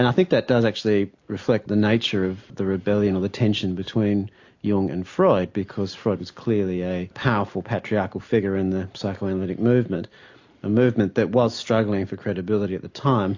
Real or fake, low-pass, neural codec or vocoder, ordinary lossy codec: real; 7.2 kHz; none; AAC, 48 kbps